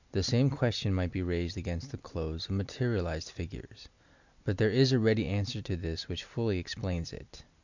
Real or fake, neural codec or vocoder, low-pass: real; none; 7.2 kHz